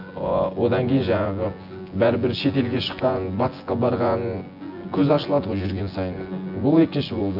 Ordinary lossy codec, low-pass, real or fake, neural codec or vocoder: none; 5.4 kHz; fake; vocoder, 24 kHz, 100 mel bands, Vocos